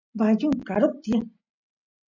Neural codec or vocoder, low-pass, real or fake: none; 7.2 kHz; real